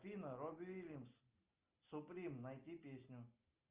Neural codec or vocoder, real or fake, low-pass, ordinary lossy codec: none; real; 3.6 kHz; Opus, 32 kbps